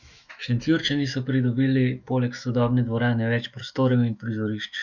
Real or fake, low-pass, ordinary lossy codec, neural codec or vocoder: fake; 7.2 kHz; none; autoencoder, 48 kHz, 128 numbers a frame, DAC-VAE, trained on Japanese speech